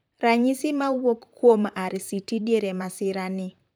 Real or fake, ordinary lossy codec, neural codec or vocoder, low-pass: fake; none; vocoder, 44.1 kHz, 128 mel bands, Pupu-Vocoder; none